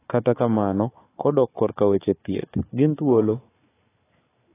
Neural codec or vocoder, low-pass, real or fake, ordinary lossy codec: codec, 16 kHz, 4 kbps, FunCodec, trained on Chinese and English, 50 frames a second; 3.6 kHz; fake; AAC, 16 kbps